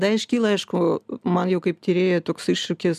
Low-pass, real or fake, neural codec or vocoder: 14.4 kHz; real; none